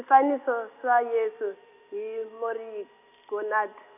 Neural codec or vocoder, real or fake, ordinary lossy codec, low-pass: none; real; none; 3.6 kHz